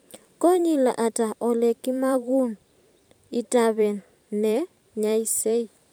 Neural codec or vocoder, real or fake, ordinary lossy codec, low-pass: vocoder, 44.1 kHz, 128 mel bands every 512 samples, BigVGAN v2; fake; none; none